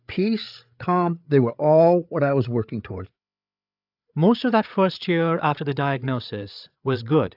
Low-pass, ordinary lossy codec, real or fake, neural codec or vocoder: 5.4 kHz; AAC, 48 kbps; fake; codec, 16 kHz, 8 kbps, FreqCodec, larger model